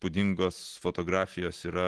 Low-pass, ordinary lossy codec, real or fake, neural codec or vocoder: 10.8 kHz; Opus, 16 kbps; real; none